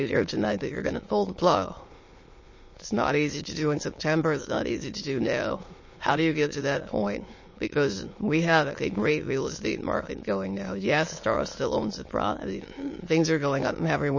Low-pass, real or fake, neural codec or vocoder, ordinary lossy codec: 7.2 kHz; fake; autoencoder, 22.05 kHz, a latent of 192 numbers a frame, VITS, trained on many speakers; MP3, 32 kbps